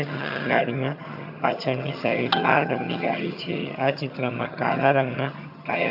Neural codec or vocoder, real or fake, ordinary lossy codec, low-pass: vocoder, 22.05 kHz, 80 mel bands, HiFi-GAN; fake; AAC, 48 kbps; 5.4 kHz